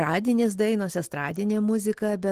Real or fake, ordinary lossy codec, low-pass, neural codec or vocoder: real; Opus, 16 kbps; 14.4 kHz; none